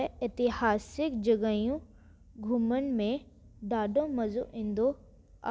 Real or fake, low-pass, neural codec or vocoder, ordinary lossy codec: real; none; none; none